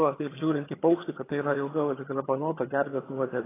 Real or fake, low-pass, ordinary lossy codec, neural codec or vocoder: fake; 3.6 kHz; AAC, 16 kbps; vocoder, 22.05 kHz, 80 mel bands, HiFi-GAN